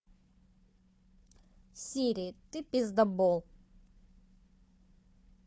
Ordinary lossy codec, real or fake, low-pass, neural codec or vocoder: none; fake; none; codec, 16 kHz, 4 kbps, FunCodec, trained on Chinese and English, 50 frames a second